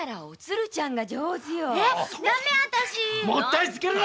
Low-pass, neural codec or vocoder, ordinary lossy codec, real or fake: none; none; none; real